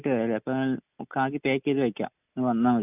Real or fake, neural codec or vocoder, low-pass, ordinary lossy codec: real; none; 3.6 kHz; none